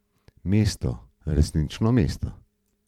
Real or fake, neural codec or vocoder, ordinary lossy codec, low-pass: fake; vocoder, 44.1 kHz, 128 mel bands every 512 samples, BigVGAN v2; MP3, 96 kbps; 19.8 kHz